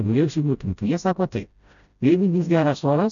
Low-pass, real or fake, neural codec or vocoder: 7.2 kHz; fake; codec, 16 kHz, 0.5 kbps, FreqCodec, smaller model